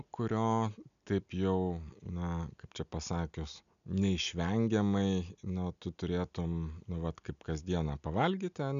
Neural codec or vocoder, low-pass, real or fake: none; 7.2 kHz; real